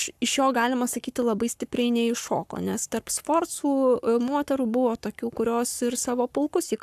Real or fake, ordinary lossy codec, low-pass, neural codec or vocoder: fake; AAC, 96 kbps; 14.4 kHz; codec, 44.1 kHz, 7.8 kbps, Pupu-Codec